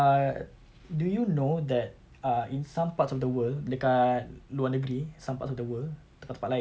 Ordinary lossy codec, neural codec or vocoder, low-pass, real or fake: none; none; none; real